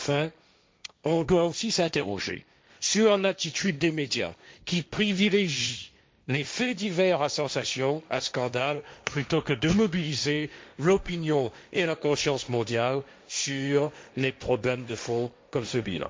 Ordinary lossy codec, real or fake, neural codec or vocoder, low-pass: none; fake; codec, 16 kHz, 1.1 kbps, Voila-Tokenizer; none